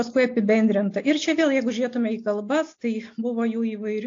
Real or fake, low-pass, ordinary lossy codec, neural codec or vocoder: real; 7.2 kHz; AAC, 48 kbps; none